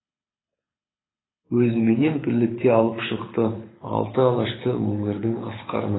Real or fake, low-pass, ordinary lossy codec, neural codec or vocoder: fake; 7.2 kHz; AAC, 16 kbps; codec, 24 kHz, 6 kbps, HILCodec